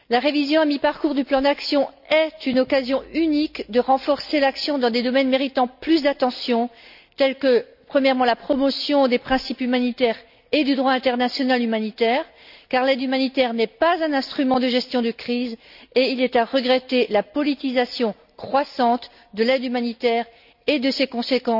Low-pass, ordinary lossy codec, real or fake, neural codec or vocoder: 5.4 kHz; none; real; none